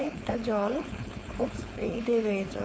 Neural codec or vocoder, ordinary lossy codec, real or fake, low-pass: codec, 16 kHz, 4.8 kbps, FACodec; none; fake; none